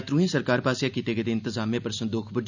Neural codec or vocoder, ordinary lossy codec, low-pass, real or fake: none; none; 7.2 kHz; real